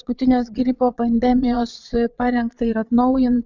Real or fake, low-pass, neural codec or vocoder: fake; 7.2 kHz; vocoder, 22.05 kHz, 80 mel bands, Vocos